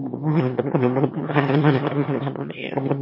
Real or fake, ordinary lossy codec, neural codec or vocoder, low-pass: fake; MP3, 24 kbps; autoencoder, 22.05 kHz, a latent of 192 numbers a frame, VITS, trained on one speaker; 5.4 kHz